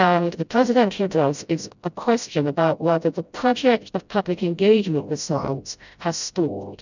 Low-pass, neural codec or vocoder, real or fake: 7.2 kHz; codec, 16 kHz, 0.5 kbps, FreqCodec, smaller model; fake